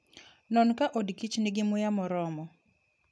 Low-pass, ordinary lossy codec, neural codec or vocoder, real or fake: none; none; none; real